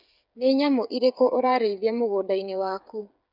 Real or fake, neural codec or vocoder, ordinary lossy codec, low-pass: fake; codec, 16 kHz, 8 kbps, FreqCodec, smaller model; none; 5.4 kHz